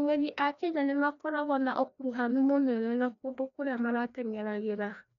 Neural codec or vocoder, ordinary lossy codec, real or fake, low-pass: codec, 16 kHz, 1 kbps, FreqCodec, larger model; none; fake; 7.2 kHz